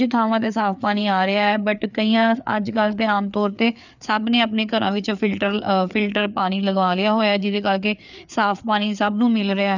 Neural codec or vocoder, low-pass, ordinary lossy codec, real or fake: codec, 16 kHz, 4 kbps, FreqCodec, larger model; 7.2 kHz; none; fake